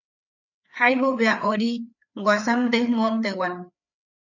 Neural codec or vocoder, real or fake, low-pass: codec, 16 kHz, 4 kbps, FreqCodec, larger model; fake; 7.2 kHz